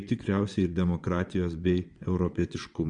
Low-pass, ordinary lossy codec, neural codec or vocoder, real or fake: 9.9 kHz; Opus, 64 kbps; vocoder, 22.05 kHz, 80 mel bands, WaveNeXt; fake